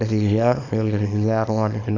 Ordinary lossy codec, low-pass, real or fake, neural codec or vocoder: none; 7.2 kHz; fake; codec, 24 kHz, 0.9 kbps, WavTokenizer, small release